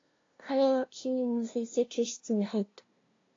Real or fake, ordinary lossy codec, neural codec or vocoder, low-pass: fake; AAC, 32 kbps; codec, 16 kHz, 0.5 kbps, FunCodec, trained on LibriTTS, 25 frames a second; 7.2 kHz